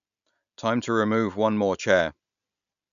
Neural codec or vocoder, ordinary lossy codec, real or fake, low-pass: none; none; real; 7.2 kHz